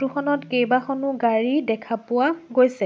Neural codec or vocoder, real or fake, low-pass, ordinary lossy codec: none; real; none; none